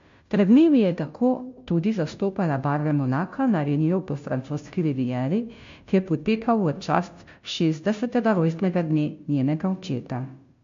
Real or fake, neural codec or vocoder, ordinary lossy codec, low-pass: fake; codec, 16 kHz, 0.5 kbps, FunCodec, trained on Chinese and English, 25 frames a second; MP3, 48 kbps; 7.2 kHz